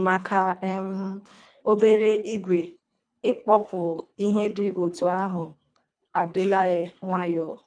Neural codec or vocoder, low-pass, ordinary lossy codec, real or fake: codec, 24 kHz, 1.5 kbps, HILCodec; 9.9 kHz; none; fake